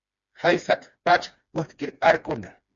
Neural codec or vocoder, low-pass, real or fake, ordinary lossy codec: codec, 16 kHz, 2 kbps, FreqCodec, smaller model; 7.2 kHz; fake; MP3, 48 kbps